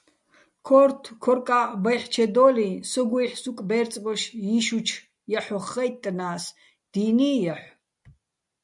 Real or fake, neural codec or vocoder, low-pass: real; none; 10.8 kHz